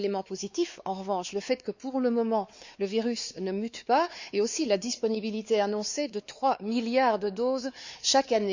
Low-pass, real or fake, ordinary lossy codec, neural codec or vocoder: 7.2 kHz; fake; Opus, 64 kbps; codec, 16 kHz, 4 kbps, X-Codec, WavLM features, trained on Multilingual LibriSpeech